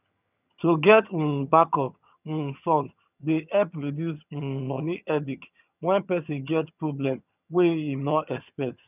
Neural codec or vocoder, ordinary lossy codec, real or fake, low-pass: vocoder, 22.05 kHz, 80 mel bands, HiFi-GAN; none; fake; 3.6 kHz